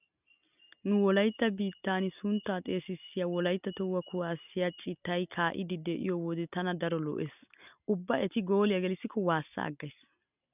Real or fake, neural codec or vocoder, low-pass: real; none; 3.6 kHz